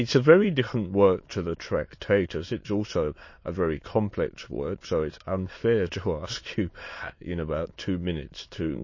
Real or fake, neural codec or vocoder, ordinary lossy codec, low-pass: fake; autoencoder, 22.05 kHz, a latent of 192 numbers a frame, VITS, trained on many speakers; MP3, 32 kbps; 7.2 kHz